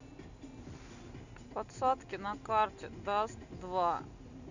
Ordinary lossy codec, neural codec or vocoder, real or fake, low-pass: none; none; real; 7.2 kHz